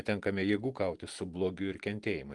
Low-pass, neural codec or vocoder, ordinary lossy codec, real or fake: 10.8 kHz; none; Opus, 24 kbps; real